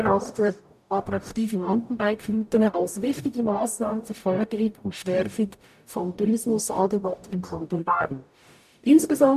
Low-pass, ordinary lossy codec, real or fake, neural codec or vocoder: 14.4 kHz; none; fake; codec, 44.1 kHz, 0.9 kbps, DAC